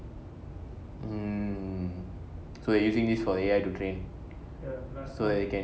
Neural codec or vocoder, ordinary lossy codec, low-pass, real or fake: none; none; none; real